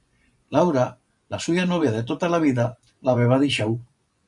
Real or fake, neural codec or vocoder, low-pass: fake; vocoder, 24 kHz, 100 mel bands, Vocos; 10.8 kHz